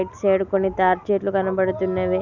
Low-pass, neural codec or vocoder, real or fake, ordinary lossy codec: 7.2 kHz; none; real; none